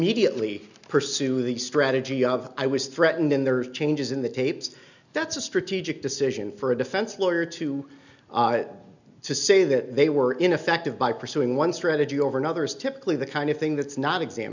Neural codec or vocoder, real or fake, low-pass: none; real; 7.2 kHz